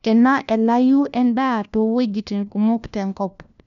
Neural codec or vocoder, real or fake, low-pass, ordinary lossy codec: codec, 16 kHz, 1 kbps, FunCodec, trained on LibriTTS, 50 frames a second; fake; 7.2 kHz; none